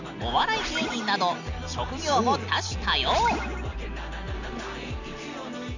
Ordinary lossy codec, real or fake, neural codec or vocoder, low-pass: none; real; none; 7.2 kHz